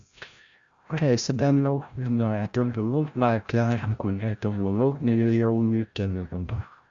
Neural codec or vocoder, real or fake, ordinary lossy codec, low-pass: codec, 16 kHz, 0.5 kbps, FreqCodec, larger model; fake; Opus, 64 kbps; 7.2 kHz